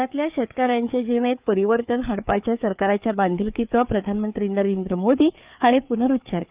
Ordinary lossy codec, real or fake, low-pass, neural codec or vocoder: Opus, 24 kbps; fake; 3.6 kHz; codec, 16 kHz, 4 kbps, FreqCodec, larger model